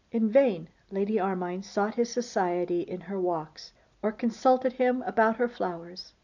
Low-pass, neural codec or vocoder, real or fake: 7.2 kHz; none; real